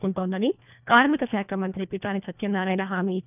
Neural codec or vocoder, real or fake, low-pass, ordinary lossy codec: codec, 24 kHz, 1.5 kbps, HILCodec; fake; 3.6 kHz; none